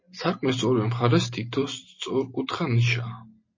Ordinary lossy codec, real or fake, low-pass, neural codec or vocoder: MP3, 32 kbps; real; 7.2 kHz; none